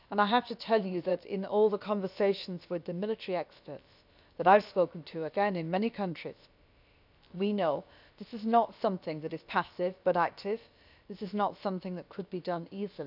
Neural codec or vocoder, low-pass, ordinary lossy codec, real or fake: codec, 16 kHz, about 1 kbps, DyCAST, with the encoder's durations; 5.4 kHz; none; fake